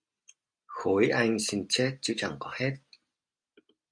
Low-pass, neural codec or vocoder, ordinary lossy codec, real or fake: 9.9 kHz; none; MP3, 64 kbps; real